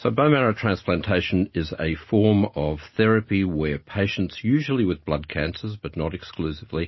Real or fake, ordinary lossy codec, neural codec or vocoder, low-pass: real; MP3, 24 kbps; none; 7.2 kHz